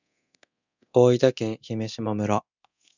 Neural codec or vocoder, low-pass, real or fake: codec, 24 kHz, 0.9 kbps, DualCodec; 7.2 kHz; fake